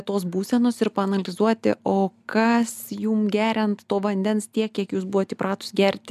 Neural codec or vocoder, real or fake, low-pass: none; real; 14.4 kHz